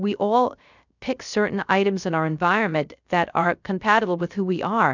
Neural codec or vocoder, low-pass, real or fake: codec, 16 kHz, 0.3 kbps, FocalCodec; 7.2 kHz; fake